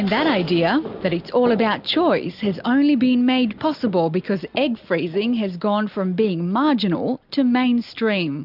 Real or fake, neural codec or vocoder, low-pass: real; none; 5.4 kHz